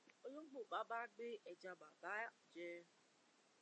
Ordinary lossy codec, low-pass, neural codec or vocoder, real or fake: MP3, 32 kbps; 9.9 kHz; none; real